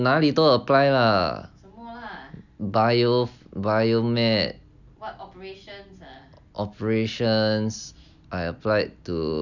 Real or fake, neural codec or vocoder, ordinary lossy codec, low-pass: real; none; none; 7.2 kHz